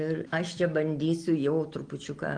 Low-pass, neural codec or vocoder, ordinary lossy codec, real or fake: 9.9 kHz; none; Opus, 24 kbps; real